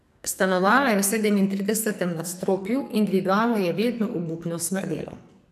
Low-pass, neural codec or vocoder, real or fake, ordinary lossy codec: 14.4 kHz; codec, 44.1 kHz, 2.6 kbps, SNAC; fake; none